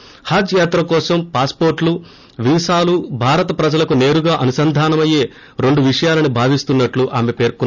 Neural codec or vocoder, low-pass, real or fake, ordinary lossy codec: none; 7.2 kHz; real; none